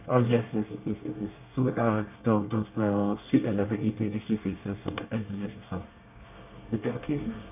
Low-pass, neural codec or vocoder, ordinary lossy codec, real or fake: 3.6 kHz; codec, 24 kHz, 1 kbps, SNAC; none; fake